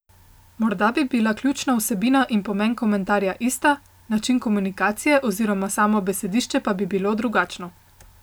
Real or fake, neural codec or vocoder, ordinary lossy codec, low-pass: real; none; none; none